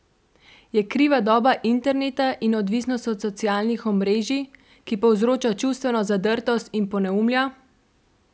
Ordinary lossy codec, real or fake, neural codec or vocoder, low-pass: none; real; none; none